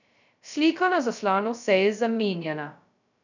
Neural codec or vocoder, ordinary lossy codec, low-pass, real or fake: codec, 16 kHz, 0.2 kbps, FocalCodec; none; 7.2 kHz; fake